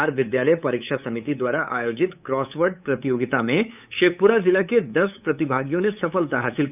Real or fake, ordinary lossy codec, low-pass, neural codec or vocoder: fake; none; 3.6 kHz; codec, 16 kHz, 8 kbps, FunCodec, trained on LibriTTS, 25 frames a second